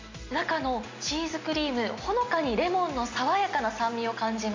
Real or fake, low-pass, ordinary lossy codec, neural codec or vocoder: real; 7.2 kHz; MP3, 48 kbps; none